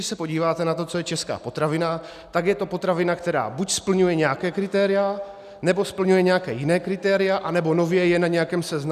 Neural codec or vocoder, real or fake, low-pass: none; real; 14.4 kHz